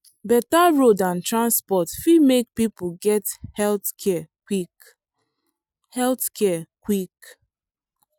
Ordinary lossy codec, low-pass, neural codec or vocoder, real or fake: none; none; none; real